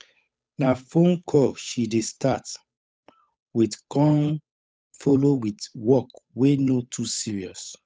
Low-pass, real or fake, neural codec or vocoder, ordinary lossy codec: none; fake; codec, 16 kHz, 8 kbps, FunCodec, trained on Chinese and English, 25 frames a second; none